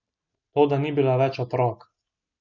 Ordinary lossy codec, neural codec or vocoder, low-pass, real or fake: none; none; 7.2 kHz; real